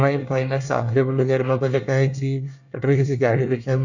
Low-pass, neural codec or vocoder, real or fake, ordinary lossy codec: 7.2 kHz; codec, 24 kHz, 1 kbps, SNAC; fake; none